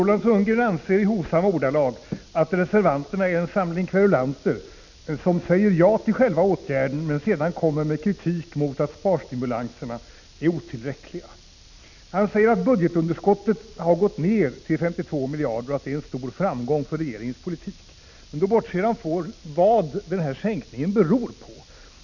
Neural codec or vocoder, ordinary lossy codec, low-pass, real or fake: none; none; 7.2 kHz; real